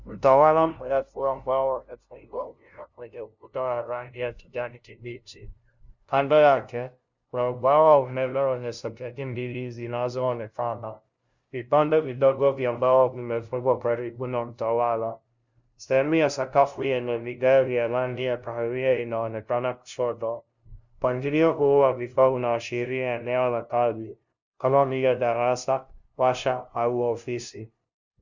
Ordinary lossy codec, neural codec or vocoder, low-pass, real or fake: Opus, 64 kbps; codec, 16 kHz, 0.5 kbps, FunCodec, trained on LibriTTS, 25 frames a second; 7.2 kHz; fake